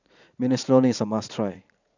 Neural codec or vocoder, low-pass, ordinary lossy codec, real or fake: vocoder, 44.1 kHz, 80 mel bands, Vocos; 7.2 kHz; none; fake